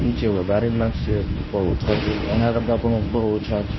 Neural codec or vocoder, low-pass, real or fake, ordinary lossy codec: codec, 24 kHz, 0.9 kbps, WavTokenizer, medium speech release version 1; 7.2 kHz; fake; MP3, 24 kbps